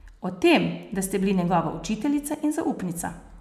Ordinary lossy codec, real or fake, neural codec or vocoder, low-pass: none; real; none; 14.4 kHz